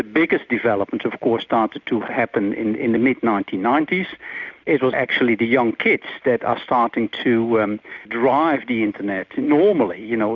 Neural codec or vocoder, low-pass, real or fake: none; 7.2 kHz; real